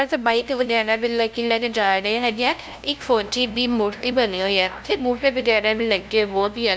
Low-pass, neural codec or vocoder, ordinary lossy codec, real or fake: none; codec, 16 kHz, 0.5 kbps, FunCodec, trained on LibriTTS, 25 frames a second; none; fake